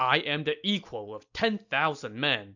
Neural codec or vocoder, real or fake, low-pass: none; real; 7.2 kHz